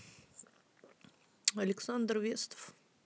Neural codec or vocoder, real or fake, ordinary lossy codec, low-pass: none; real; none; none